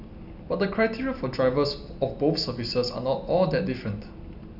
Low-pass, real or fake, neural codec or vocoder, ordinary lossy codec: 5.4 kHz; real; none; none